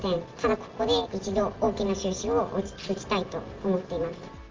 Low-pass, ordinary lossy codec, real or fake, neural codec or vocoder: 7.2 kHz; Opus, 24 kbps; real; none